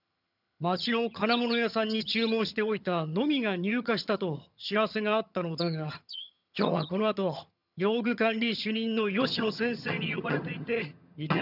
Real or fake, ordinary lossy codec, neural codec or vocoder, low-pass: fake; none; vocoder, 22.05 kHz, 80 mel bands, HiFi-GAN; 5.4 kHz